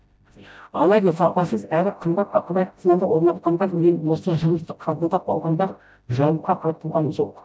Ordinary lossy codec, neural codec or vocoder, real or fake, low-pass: none; codec, 16 kHz, 0.5 kbps, FreqCodec, smaller model; fake; none